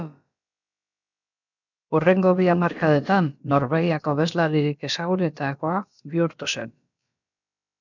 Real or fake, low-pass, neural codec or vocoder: fake; 7.2 kHz; codec, 16 kHz, about 1 kbps, DyCAST, with the encoder's durations